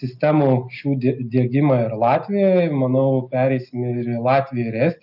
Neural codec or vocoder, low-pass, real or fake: none; 5.4 kHz; real